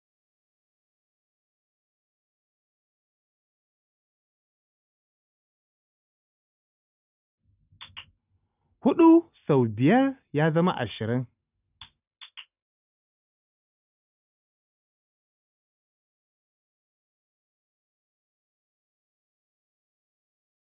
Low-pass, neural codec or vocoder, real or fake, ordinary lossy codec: 3.6 kHz; none; real; none